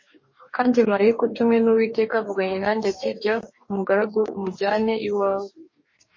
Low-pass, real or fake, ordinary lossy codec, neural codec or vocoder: 7.2 kHz; fake; MP3, 32 kbps; codec, 44.1 kHz, 2.6 kbps, DAC